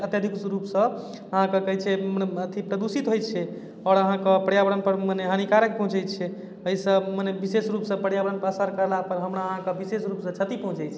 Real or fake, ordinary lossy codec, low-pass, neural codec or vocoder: real; none; none; none